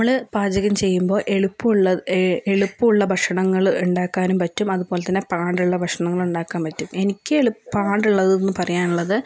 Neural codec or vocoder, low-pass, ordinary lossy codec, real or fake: none; none; none; real